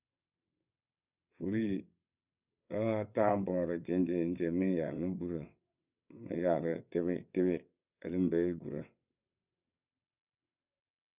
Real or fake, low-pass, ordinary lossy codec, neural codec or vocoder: fake; 3.6 kHz; none; vocoder, 22.05 kHz, 80 mel bands, WaveNeXt